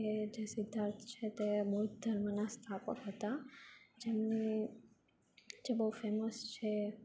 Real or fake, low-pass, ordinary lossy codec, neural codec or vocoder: real; none; none; none